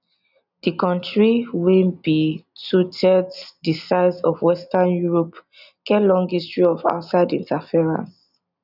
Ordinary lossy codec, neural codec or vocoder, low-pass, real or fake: none; none; 5.4 kHz; real